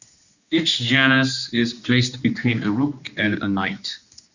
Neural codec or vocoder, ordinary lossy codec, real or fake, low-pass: codec, 16 kHz, 2 kbps, X-Codec, HuBERT features, trained on general audio; Opus, 64 kbps; fake; 7.2 kHz